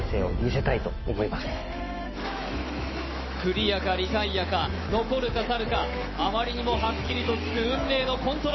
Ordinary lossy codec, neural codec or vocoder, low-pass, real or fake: MP3, 24 kbps; autoencoder, 48 kHz, 128 numbers a frame, DAC-VAE, trained on Japanese speech; 7.2 kHz; fake